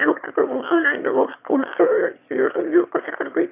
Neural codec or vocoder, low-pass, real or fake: autoencoder, 22.05 kHz, a latent of 192 numbers a frame, VITS, trained on one speaker; 3.6 kHz; fake